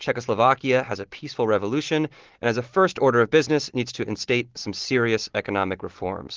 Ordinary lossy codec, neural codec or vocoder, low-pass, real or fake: Opus, 16 kbps; none; 7.2 kHz; real